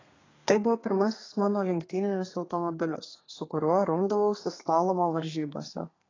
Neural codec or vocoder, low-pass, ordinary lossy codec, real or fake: codec, 32 kHz, 1.9 kbps, SNAC; 7.2 kHz; AAC, 32 kbps; fake